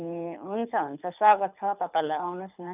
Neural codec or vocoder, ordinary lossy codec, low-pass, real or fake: codec, 24 kHz, 6 kbps, HILCodec; none; 3.6 kHz; fake